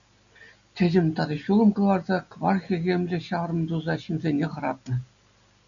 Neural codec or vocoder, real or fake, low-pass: none; real; 7.2 kHz